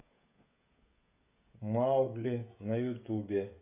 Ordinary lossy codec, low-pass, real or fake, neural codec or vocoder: none; 3.6 kHz; fake; codec, 16 kHz, 16 kbps, FreqCodec, smaller model